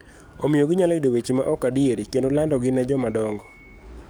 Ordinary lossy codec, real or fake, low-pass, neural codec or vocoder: none; fake; none; codec, 44.1 kHz, 7.8 kbps, DAC